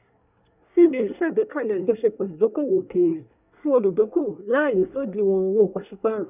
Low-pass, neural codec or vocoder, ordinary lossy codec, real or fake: 3.6 kHz; codec, 24 kHz, 1 kbps, SNAC; none; fake